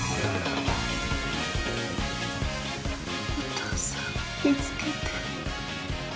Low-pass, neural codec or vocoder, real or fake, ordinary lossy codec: none; none; real; none